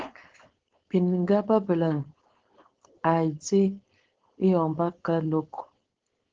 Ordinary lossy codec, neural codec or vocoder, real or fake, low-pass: Opus, 16 kbps; codec, 16 kHz, 4.8 kbps, FACodec; fake; 7.2 kHz